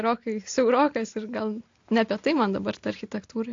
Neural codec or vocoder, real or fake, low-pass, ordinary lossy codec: none; real; 7.2 kHz; AAC, 48 kbps